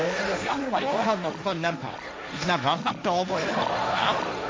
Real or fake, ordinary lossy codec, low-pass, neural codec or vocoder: fake; none; none; codec, 16 kHz, 1.1 kbps, Voila-Tokenizer